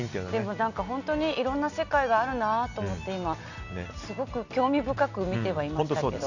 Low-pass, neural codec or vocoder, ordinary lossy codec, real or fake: 7.2 kHz; none; none; real